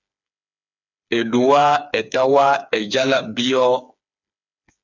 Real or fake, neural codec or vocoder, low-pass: fake; codec, 16 kHz, 4 kbps, FreqCodec, smaller model; 7.2 kHz